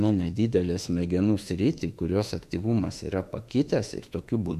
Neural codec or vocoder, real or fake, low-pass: autoencoder, 48 kHz, 32 numbers a frame, DAC-VAE, trained on Japanese speech; fake; 14.4 kHz